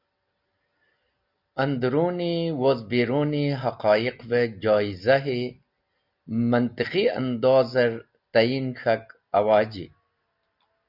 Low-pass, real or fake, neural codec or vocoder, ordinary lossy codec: 5.4 kHz; real; none; Opus, 64 kbps